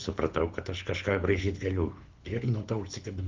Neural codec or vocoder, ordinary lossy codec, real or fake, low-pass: codec, 24 kHz, 6 kbps, HILCodec; Opus, 24 kbps; fake; 7.2 kHz